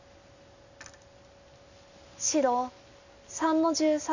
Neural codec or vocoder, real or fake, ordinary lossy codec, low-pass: none; real; none; 7.2 kHz